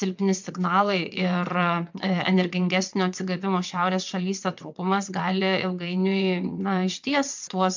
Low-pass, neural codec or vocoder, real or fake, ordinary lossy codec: 7.2 kHz; vocoder, 22.05 kHz, 80 mel bands, WaveNeXt; fake; MP3, 64 kbps